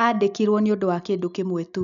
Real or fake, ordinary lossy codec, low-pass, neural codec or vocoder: real; none; 7.2 kHz; none